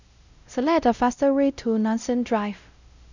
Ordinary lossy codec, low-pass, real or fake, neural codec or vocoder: none; 7.2 kHz; fake; codec, 16 kHz, 0.5 kbps, X-Codec, WavLM features, trained on Multilingual LibriSpeech